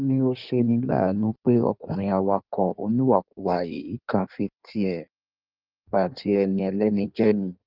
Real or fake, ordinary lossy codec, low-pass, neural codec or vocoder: fake; Opus, 24 kbps; 5.4 kHz; codec, 16 kHz in and 24 kHz out, 1.1 kbps, FireRedTTS-2 codec